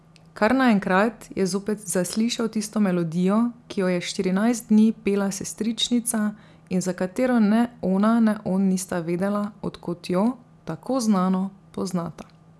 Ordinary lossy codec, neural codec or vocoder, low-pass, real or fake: none; none; none; real